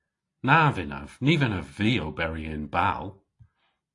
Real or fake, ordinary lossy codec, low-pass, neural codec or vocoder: real; AAC, 48 kbps; 10.8 kHz; none